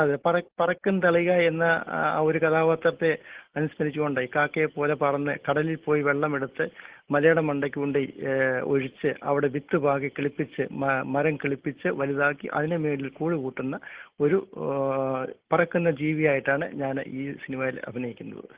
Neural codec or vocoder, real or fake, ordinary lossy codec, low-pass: none; real; Opus, 16 kbps; 3.6 kHz